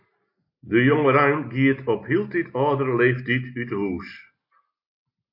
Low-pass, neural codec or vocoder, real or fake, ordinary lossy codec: 5.4 kHz; none; real; MP3, 32 kbps